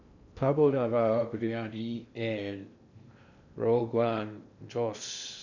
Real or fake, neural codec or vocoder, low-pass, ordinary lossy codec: fake; codec, 16 kHz in and 24 kHz out, 0.6 kbps, FocalCodec, streaming, 2048 codes; 7.2 kHz; none